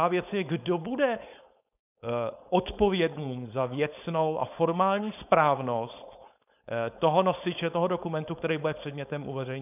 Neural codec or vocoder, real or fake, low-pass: codec, 16 kHz, 4.8 kbps, FACodec; fake; 3.6 kHz